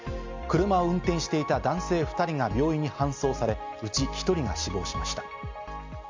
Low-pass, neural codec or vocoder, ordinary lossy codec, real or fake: 7.2 kHz; none; MP3, 64 kbps; real